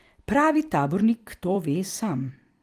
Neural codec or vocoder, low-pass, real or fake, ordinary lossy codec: vocoder, 44.1 kHz, 128 mel bands every 256 samples, BigVGAN v2; 14.4 kHz; fake; Opus, 24 kbps